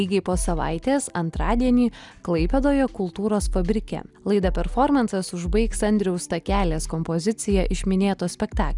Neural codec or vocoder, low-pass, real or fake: none; 10.8 kHz; real